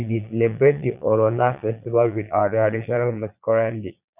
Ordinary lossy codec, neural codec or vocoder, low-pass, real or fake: none; vocoder, 22.05 kHz, 80 mel bands, Vocos; 3.6 kHz; fake